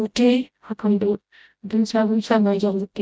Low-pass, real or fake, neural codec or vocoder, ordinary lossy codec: none; fake; codec, 16 kHz, 0.5 kbps, FreqCodec, smaller model; none